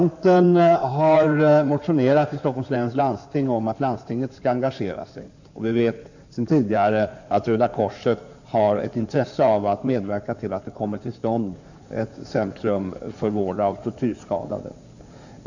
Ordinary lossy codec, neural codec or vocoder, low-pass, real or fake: none; codec, 16 kHz in and 24 kHz out, 2.2 kbps, FireRedTTS-2 codec; 7.2 kHz; fake